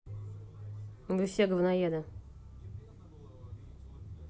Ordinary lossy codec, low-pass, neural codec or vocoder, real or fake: none; none; none; real